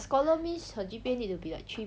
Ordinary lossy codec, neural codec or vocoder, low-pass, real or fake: none; none; none; real